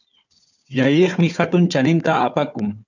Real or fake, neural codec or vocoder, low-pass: fake; codec, 16 kHz, 4 kbps, FunCodec, trained on Chinese and English, 50 frames a second; 7.2 kHz